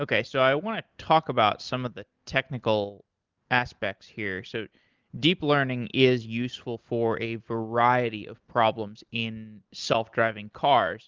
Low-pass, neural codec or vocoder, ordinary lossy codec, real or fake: 7.2 kHz; none; Opus, 32 kbps; real